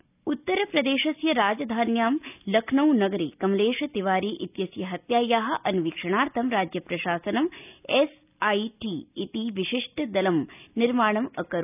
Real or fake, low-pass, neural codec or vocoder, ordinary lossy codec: real; 3.6 kHz; none; none